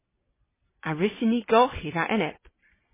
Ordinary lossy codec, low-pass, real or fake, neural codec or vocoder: MP3, 16 kbps; 3.6 kHz; real; none